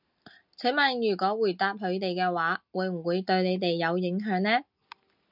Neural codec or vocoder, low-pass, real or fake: none; 5.4 kHz; real